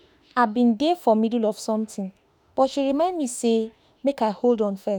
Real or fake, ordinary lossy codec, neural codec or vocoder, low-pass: fake; none; autoencoder, 48 kHz, 32 numbers a frame, DAC-VAE, trained on Japanese speech; none